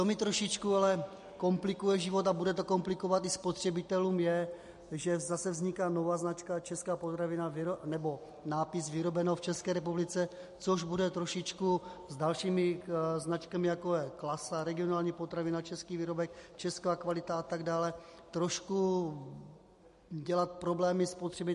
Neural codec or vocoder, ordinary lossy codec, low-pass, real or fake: none; MP3, 48 kbps; 14.4 kHz; real